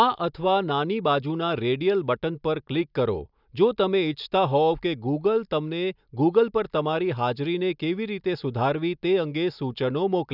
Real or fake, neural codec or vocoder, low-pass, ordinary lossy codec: real; none; 5.4 kHz; none